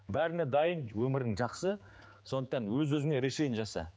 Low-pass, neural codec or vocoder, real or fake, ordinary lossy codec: none; codec, 16 kHz, 4 kbps, X-Codec, HuBERT features, trained on general audio; fake; none